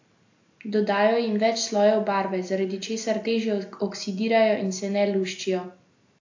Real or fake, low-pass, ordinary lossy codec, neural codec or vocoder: real; 7.2 kHz; AAC, 48 kbps; none